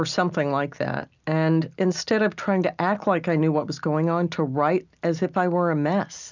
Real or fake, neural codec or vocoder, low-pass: real; none; 7.2 kHz